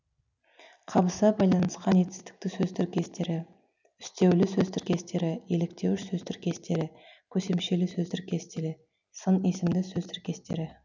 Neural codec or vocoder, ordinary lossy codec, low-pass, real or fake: none; none; 7.2 kHz; real